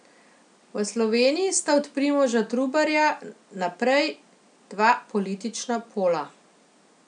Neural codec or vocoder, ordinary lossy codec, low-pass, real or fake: none; none; 9.9 kHz; real